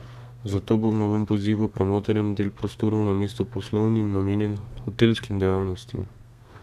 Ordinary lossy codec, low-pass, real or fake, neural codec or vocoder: none; 14.4 kHz; fake; codec, 32 kHz, 1.9 kbps, SNAC